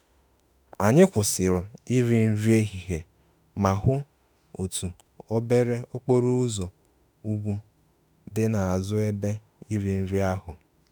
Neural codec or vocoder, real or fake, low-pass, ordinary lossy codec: autoencoder, 48 kHz, 32 numbers a frame, DAC-VAE, trained on Japanese speech; fake; none; none